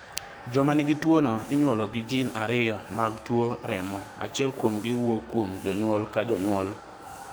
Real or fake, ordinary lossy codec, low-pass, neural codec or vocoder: fake; none; none; codec, 44.1 kHz, 2.6 kbps, SNAC